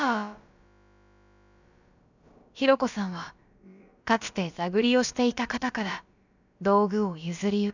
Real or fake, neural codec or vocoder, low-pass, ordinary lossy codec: fake; codec, 16 kHz, about 1 kbps, DyCAST, with the encoder's durations; 7.2 kHz; none